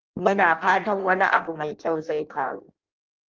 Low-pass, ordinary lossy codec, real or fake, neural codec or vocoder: 7.2 kHz; Opus, 32 kbps; fake; codec, 16 kHz in and 24 kHz out, 0.6 kbps, FireRedTTS-2 codec